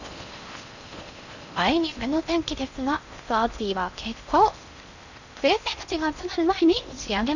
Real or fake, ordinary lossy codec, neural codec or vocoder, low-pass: fake; none; codec, 16 kHz in and 24 kHz out, 0.6 kbps, FocalCodec, streaming, 4096 codes; 7.2 kHz